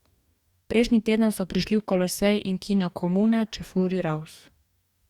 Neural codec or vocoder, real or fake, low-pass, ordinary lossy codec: codec, 44.1 kHz, 2.6 kbps, DAC; fake; 19.8 kHz; Opus, 64 kbps